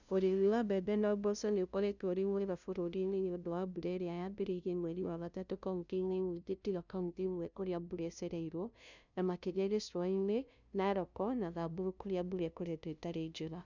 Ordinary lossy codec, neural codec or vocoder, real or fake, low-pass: none; codec, 16 kHz, 0.5 kbps, FunCodec, trained on LibriTTS, 25 frames a second; fake; 7.2 kHz